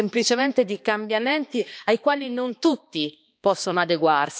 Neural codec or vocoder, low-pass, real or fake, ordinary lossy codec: codec, 16 kHz, 2 kbps, X-Codec, HuBERT features, trained on balanced general audio; none; fake; none